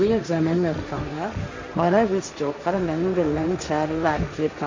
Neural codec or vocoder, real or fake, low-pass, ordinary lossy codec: codec, 16 kHz, 1.1 kbps, Voila-Tokenizer; fake; 7.2 kHz; MP3, 48 kbps